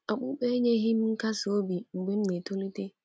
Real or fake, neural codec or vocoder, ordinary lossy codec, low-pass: real; none; none; none